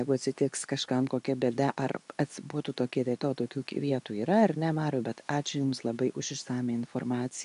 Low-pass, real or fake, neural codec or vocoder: 10.8 kHz; fake; codec, 24 kHz, 0.9 kbps, WavTokenizer, medium speech release version 2